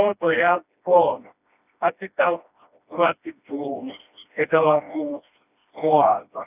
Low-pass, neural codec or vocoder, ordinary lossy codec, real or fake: 3.6 kHz; codec, 16 kHz, 1 kbps, FreqCodec, smaller model; none; fake